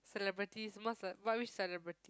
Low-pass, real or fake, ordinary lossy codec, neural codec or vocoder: none; real; none; none